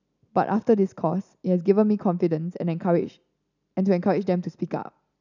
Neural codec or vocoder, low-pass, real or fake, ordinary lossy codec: none; 7.2 kHz; real; none